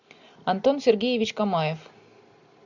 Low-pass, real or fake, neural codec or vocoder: 7.2 kHz; real; none